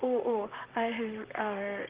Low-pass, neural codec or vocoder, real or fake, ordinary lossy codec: 3.6 kHz; vocoder, 44.1 kHz, 128 mel bands, Pupu-Vocoder; fake; Opus, 16 kbps